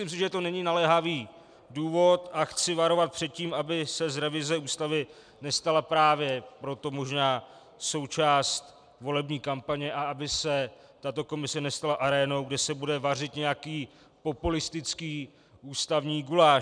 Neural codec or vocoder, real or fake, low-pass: none; real; 9.9 kHz